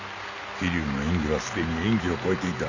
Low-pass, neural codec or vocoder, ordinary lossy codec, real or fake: 7.2 kHz; none; none; real